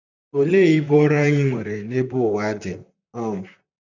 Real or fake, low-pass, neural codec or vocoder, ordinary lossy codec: fake; 7.2 kHz; vocoder, 44.1 kHz, 128 mel bands, Pupu-Vocoder; none